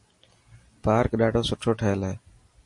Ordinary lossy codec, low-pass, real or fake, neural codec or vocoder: MP3, 48 kbps; 10.8 kHz; real; none